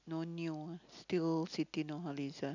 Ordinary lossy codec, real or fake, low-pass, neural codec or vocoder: none; real; 7.2 kHz; none